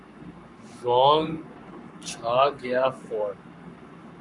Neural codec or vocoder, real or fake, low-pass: codec, 44.1 kHz, 7.8 kbps, Pupu-Codec; fake; 10.8 kHz